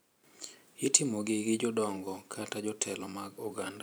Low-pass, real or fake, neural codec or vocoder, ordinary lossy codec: none; fake; vocoder, 44.1 kHz, 128 mel bands every 512 samples, BigVGAN v2; none